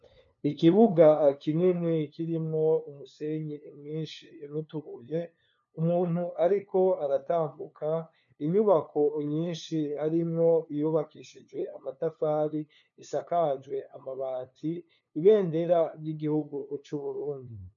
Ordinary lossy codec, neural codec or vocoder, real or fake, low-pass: MP3, 96 kbps; codec, 16 kHz, 2 kbps, FunCodec, trained on LibriTTS, 25 frames a second; fake; 7.2 kHz